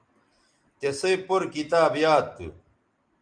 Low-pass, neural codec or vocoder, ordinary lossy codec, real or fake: 9.9 kHz; none; Opus, 32 kbps; real